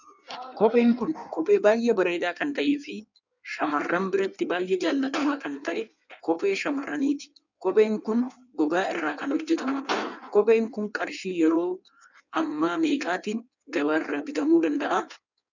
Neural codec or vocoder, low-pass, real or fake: codec, 16 kHz in and 24 kHz out, 1.1 kbps, FireRedTTS-2 codec; 7.2 kHz; fake